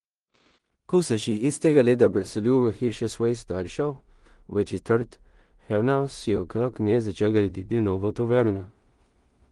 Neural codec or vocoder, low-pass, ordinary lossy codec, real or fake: codec, 16 kHz in and 24 kHz out, 0.4 kbps, LongCat-Audio-Codec, two codebook decoder; 10.8 kHz; Opus, 24 kbps; fake